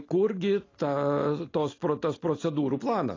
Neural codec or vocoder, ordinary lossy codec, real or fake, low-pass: none; AAC, 32 kbps; real; 7.2 kHz